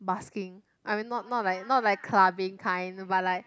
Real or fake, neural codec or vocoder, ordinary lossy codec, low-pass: real; none; none; none